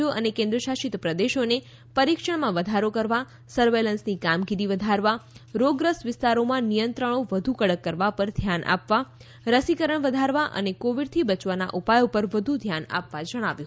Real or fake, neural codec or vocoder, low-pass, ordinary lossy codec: real; none; none; none